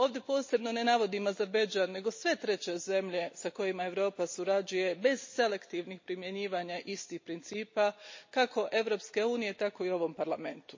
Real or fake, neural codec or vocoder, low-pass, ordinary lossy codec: real; none; 7.2 kHz; MP3, 32 kbps